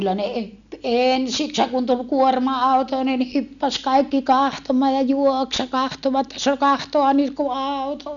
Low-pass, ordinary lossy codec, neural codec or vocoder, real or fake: 7.2 kHz; none; none; real